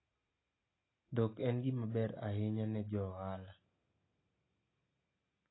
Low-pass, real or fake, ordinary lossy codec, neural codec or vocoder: 7.2 kHz; real; AAC, 16 kbps; none